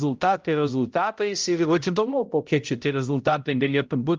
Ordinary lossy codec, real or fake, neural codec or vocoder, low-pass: Opus, 32 kbps; fake; codec, 16 kHz, 0.5 kbps, X-Codec, HuBERT features, trained on balanced general audio; 7.2 kHz